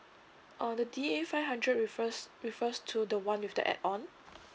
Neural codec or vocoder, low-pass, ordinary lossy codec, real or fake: none; none; none; real